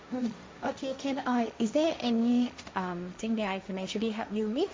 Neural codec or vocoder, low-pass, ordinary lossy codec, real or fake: codec, 16 kHz, 1.1 kbps, Voila-Tokenizer; none; none; fake